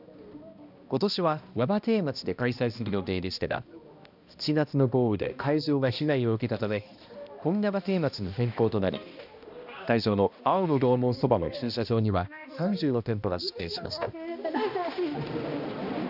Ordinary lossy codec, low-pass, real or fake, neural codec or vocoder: none; 5.4 kHz; fake; codec, 16 kHz, 1 kbps, X-Codec, HuBERT features, trained on balanced general audio